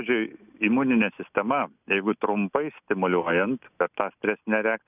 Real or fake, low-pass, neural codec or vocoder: real; 3.6 kHz; none